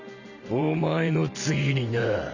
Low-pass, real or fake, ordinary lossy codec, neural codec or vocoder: 7.2 kHz; fake; none; vocoder, 44.1 kHz, 128 mel bands every 512 samples, BigVGAN v2